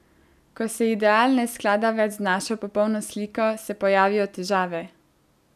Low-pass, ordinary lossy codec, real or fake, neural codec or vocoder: 14.4 kHz; none; real; none